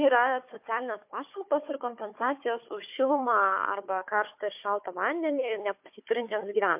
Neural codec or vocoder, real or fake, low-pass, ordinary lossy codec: codec, 16 kHz, 4 kbps, FunCodec, trained on Chinese and English, 50 frames a second; fake; 3.6 kHz; MP3, 32 kbps